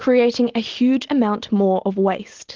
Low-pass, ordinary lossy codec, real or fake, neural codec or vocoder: 7.2 kHz; Opus, 16 kbps; real; none